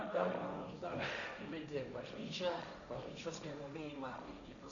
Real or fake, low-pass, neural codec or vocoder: fake; 7.2 kHz; codec, 16 kHz, 1.1 kbps, Voila-Tokenizer